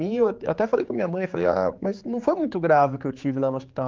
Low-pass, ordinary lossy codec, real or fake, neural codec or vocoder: 7.2 kHz; Opus, 24 kbps; fake; codec, 16 kHz, 4 kbps, X-Codec, HuBERT features, trained on general audio